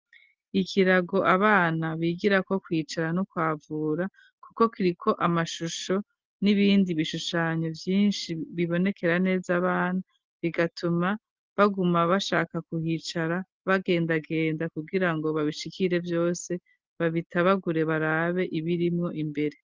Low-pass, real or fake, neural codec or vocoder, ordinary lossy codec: 7.2 kHz; real; none; Opus, 32 kbps